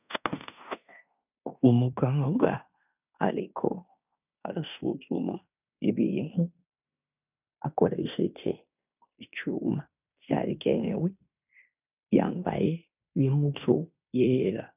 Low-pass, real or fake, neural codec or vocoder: 3.6 kHz; fake; codec, 16 kHz in and 24 kHz out, 0.9 kbps, LongCat-Audio-Codec, fine tuned four codebook decoder